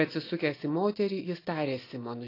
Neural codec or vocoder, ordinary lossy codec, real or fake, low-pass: none; AAC, 24 kbps; real; 5.4 kHz